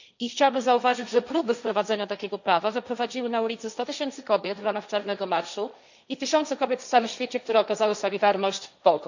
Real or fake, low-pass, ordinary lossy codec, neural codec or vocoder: fake; 7.2 kHz; none; codec, 16 kHz, 1.1 kbps, Voila-Tokenizer